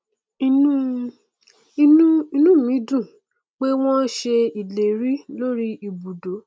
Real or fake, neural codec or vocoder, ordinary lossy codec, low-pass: real; none; none; none